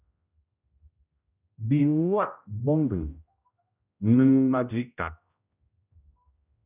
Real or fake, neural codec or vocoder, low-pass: fake; codec, 16 kHz, 0.5 kbps, X-Codec, HuBERT features, trained on general audio; 3.6 kHz